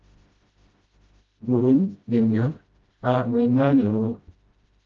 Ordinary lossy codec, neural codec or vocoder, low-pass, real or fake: Opus, 24 kbps; codec, 16 kHz, 0.5 kbps, FreqCodec, smaller model; 7.2 kHz; fake